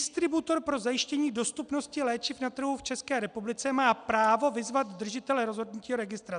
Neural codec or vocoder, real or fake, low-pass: none; real; 9.9 kHz